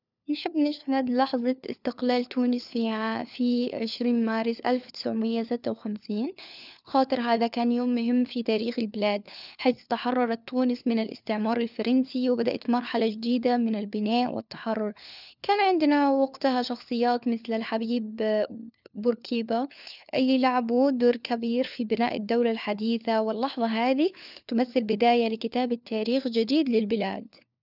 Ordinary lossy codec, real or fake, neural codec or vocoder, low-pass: none; fake; codec, 16 kHz, 4 kbps, FunCodec, trained on LibriTTS, 50 frames a second; 5.4 kHz